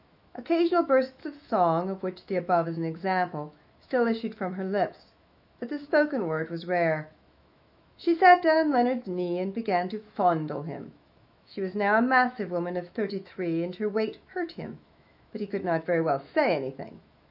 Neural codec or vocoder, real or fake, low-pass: autoencoder, 48 kHz, 128 numbers a frame, DAC-VAE, trained on Japanese speech; fake; 5.4 kHz